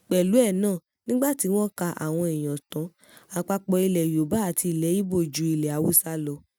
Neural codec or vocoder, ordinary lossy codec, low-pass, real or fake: none; none; none; real